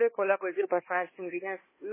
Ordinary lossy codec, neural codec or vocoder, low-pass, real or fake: MP3, 16 kbps; codec, 16 kHz, 1 kbps, X-Codec, HuBERT features, trained on balanced general audio; 3.6 kHz; fake